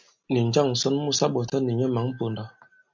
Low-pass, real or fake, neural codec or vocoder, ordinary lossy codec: 7.2 kHz; real; none; MP3, 64 kbps